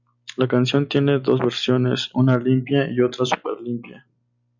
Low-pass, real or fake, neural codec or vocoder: 7.2 kHz; real; none